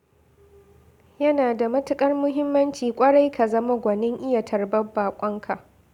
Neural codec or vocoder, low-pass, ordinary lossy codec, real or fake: none; 19.8 kHz; none; real